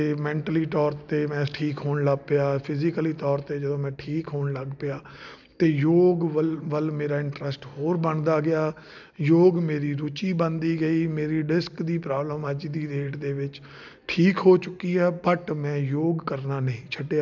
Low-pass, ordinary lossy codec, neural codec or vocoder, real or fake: 7.2 kHz; none; none; real